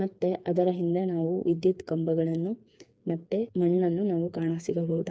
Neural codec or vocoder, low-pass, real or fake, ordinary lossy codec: codec, 16 kHz, 4 kbps, FreqCodec, smaller model; none; fake; none